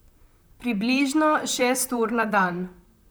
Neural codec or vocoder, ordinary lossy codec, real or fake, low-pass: vocoder, 44.1 kHz, 128 mel bands, Pupu-Vocoder; none; fake; none